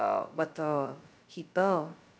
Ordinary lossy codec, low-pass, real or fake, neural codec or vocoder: none; none; fake; codec, 16 kHz, 0.2 kbps, FocalCodec